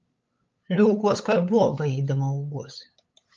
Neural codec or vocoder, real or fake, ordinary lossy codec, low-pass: codec, 16 kHz, 8 kbps, FunCodec, trained on LibriTTS, 25 frames a second; fake; Opus, 24 kbps; 7.2 kHz